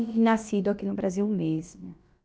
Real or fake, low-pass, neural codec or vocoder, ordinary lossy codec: fake; none; codec, 16 kHz, about 1 kbps, DyCAST, with the encoder's durations; none